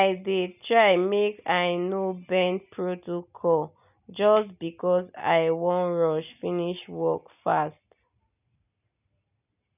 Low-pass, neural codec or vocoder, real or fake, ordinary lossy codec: 3.6 kHz; none; real; none